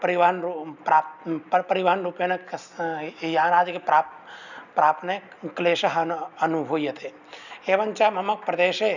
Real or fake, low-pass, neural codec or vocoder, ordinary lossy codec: real; 7.2 kHz; none; none